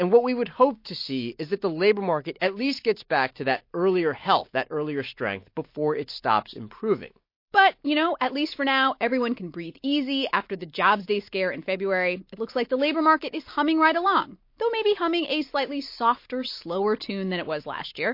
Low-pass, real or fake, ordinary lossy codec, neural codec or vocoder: 5.4 kHz; real; MP3, 32 kbps; none